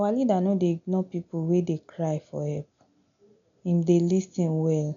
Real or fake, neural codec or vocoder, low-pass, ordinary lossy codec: real; none; 7.2 kHz; none